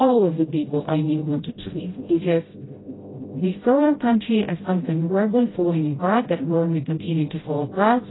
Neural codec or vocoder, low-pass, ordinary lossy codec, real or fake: codec, 16 kHz, 0.5 kbps, FreqCodec, smaller model; 7.2 kHz; AAC, 16 kbps; fake